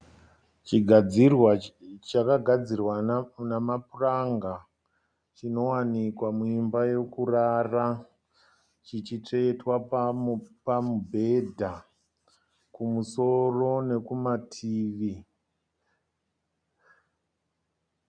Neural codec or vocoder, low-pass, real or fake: none; 9.9 kHz; real